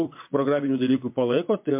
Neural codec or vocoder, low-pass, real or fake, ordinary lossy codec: vocoder, 22.05 kHz, 80 mel bands, WaveNeXt; 3.6 kHz; fake; MP3, 32 kbps